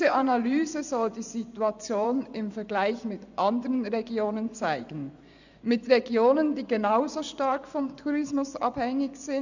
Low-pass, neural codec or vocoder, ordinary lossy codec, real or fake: 7.2 kHz; vocoder, 24 kHz, 100 mel bands, Vocos; none; fake